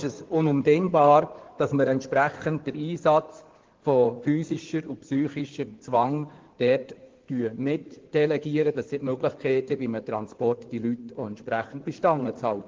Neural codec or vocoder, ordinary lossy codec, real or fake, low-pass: codec, 16 kHz in and 24 kHz out, 2.2 kbps, FireRedTTS-2 codec; Opus, 16 kbps; fake; 7.2 kHz